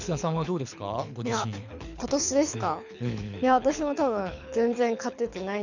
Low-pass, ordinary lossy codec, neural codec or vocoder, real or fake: 7.2 kHz; none; codec, 24 kHz, 6 kbps, HILCodec; fake